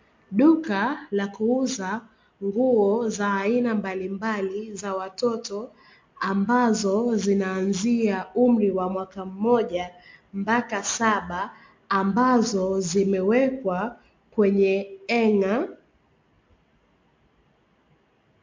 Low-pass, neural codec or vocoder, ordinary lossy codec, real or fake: 7.2 kHz; none; MP3, 48 kbps; real